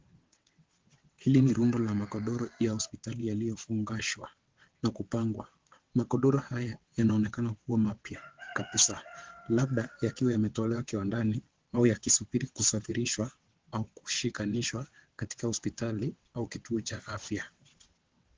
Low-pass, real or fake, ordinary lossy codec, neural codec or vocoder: 7.2 kHz; fake; Opus, 16 kbps; vocoder, 22.05 kHz, 80 mel bands, WaveNeXt